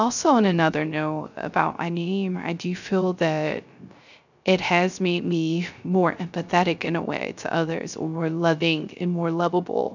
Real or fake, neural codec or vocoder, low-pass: fake; codec, 16 kHz, 0.3 kbps, FocalCodec; 7.2 kHz